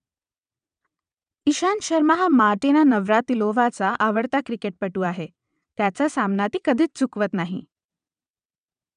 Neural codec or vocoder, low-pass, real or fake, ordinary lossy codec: vocoder, 22.05 kHz, 80 mel bands, WaveNeXt; 9.9 kHz; fake; none